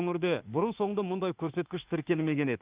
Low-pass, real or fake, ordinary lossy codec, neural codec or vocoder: 3.6 kHz; fake; Opus, 32 kbps; autoencoder, 48 kHz, 32 numbers a frame, DAC-VAE, trained on Japanese speech